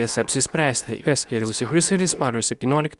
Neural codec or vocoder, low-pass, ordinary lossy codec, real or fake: codec, 24 kHz, 0.9 kbps, WavTokenizer, medium speech release version 2; 10.8 kHz; Opus, 64 kbps; fake